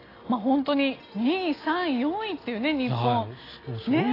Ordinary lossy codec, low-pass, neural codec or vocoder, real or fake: AAC, 24 kbps; 5.4 kHz; none; real